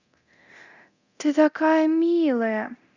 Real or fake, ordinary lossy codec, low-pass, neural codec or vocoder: fake; Opus, 64 kbps; 7.2 kHz; codec, 24 kHz, 0.9 kbps, DualCodec